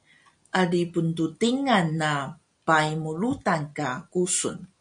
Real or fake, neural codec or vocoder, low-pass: real; none; 9.9 kHz